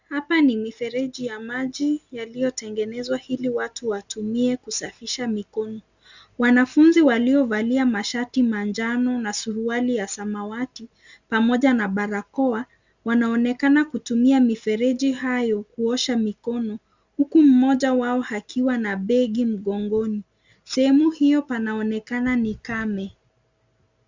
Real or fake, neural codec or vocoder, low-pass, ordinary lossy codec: real; none; 7.2 kHz; Opus, 64 kbps